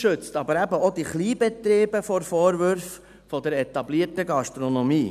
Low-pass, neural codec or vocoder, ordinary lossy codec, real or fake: 14.4 kHz; none; none; real